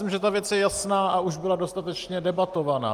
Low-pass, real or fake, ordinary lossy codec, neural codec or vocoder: 14.4 kHz; real; Opus, 32 kbps; none